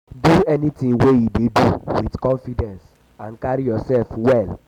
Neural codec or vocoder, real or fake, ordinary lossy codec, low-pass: none; real; none; 19.8 kHz